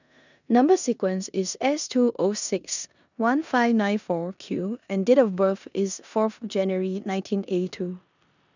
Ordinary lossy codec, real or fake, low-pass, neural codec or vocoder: none; fake; 7.2 kHz; codec, 16 kHz in and 24 kHz out, 0.9 kbps, LongCat-Audio-Codec, four codebook decoder